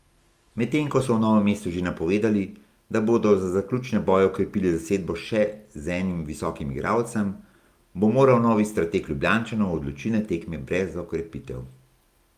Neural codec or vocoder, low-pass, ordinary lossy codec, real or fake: none; 14.4 kHz; Opus, 32 kbps; real